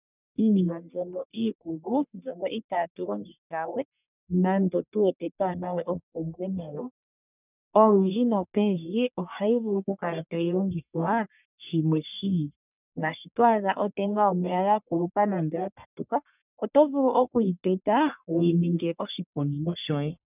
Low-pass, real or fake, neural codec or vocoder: 3.6 kHz; fake; codec, 44.1 kHz, 1.7 kbps, Pupu-Codec